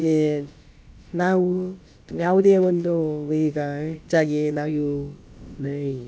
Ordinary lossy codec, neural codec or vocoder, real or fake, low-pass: none; codec, 16 kHz, about 1 kbps, DyCAST, with the encoder's durations; fake; none